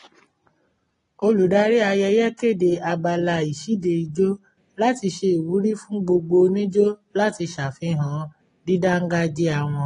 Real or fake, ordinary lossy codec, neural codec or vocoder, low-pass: real; AAC, 32 kbps; none; 10.8 kHz